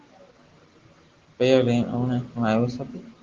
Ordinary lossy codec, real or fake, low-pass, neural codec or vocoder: Opus, 16 kbps; real; 7.2 kHz; none